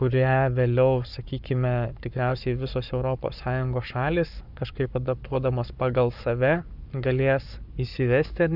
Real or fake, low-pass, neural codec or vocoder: fake; 5.4 kHz; codec, 44.1 kHz, 7.8 kbps, Pupu-Codec